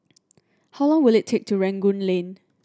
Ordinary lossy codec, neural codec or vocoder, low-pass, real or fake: none; none; none; real